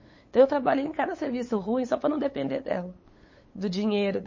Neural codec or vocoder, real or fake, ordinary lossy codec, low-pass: codec, 16 kHz, 8 kbps, FunCodec, trained on LibriTTS, 25 frames a second; fake; MP3, 32 kbps; 7.2 kHz